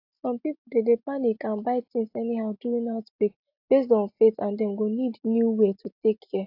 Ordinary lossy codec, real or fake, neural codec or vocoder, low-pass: AAC, 32 kbps; real; none; 5.4 kHz